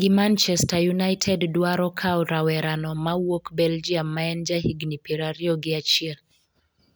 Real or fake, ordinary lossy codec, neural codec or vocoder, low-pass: real; none; none; none